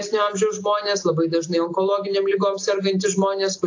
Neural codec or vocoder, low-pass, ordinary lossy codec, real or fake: none; 7.2 kHz; AAC, 48 kbps; real